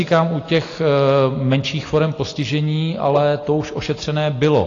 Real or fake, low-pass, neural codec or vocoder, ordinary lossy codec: real; 7.2 kHz; none; AAC, 32 kbps